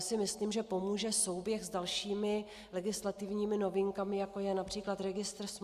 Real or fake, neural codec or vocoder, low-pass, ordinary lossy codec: real; none; 14.4 kHz; Opus, 64 kbps